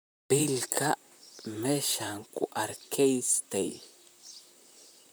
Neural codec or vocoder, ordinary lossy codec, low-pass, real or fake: vocoder, 44.1 kHz, 128 mel bands, Pupu-Vocoder; none; none; fake